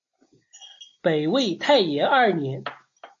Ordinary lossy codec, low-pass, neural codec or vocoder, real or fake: AAC, 48 kbps; 7.2 kHz; none; real